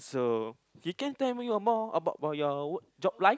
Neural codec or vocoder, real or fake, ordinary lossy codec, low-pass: none; real; none; none